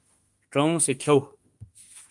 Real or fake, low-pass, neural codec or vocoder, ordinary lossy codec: fake; 10.8 kHz; autoencoder, 48 kHz, 32 numbers a frame, DAC-VAE, trained on Japanese speech; Opus, 32 kbps